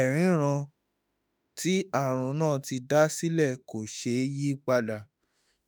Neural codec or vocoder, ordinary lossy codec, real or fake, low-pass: autoencoder, 48 kHz, 32 numbers a frame, DAC-VAE, trained on Japanese speech; none; fake; none